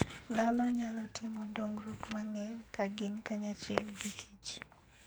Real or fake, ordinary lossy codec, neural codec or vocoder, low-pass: fake; none; codec, 44.1 kHz, 2.6 kbps, SNAC; none